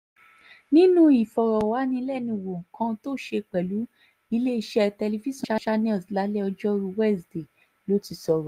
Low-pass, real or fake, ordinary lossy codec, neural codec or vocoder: 14.4 kHz; real; Opus, 32 kbps; none